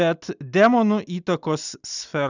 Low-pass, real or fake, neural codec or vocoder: 7.2 kHz; real; none